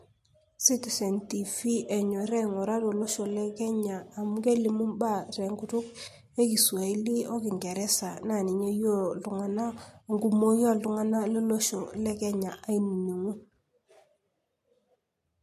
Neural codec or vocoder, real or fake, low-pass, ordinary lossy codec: none; real; 14.4 kHz; MP3, 64 kbps